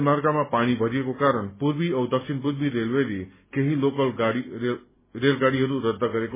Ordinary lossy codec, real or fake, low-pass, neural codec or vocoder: MP3, 16 kbps; real; 3.6 kHz; none